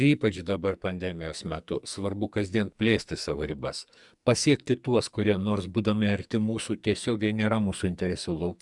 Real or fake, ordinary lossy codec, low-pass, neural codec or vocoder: fake; Opus, 64 kbps; 10.8 kHz; codec, 44.1 kHz, 2.6 kbps, SNAC